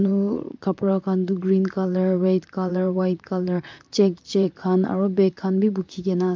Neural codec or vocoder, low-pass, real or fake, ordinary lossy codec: vocoder, 44.1 kHz, 128 mel bands, Pupu-Vocoder; 7.2 kHz; fake; MP3, 64 kbps